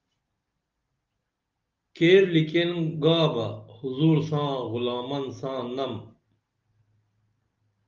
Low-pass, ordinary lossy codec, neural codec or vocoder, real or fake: 7.2 kHz; Opus, 24 kbps; none; real